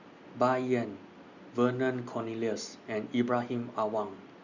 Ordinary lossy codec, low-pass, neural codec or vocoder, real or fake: Opus, 64 kbps; 7.2 kHz; none; real